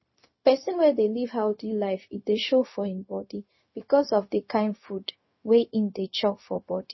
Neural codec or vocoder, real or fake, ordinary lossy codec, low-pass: codec, 16 kHz, 0.4 kbps, LongCat-Audio-Codec; fake; MP3, 24 kbps; 7.2 kHz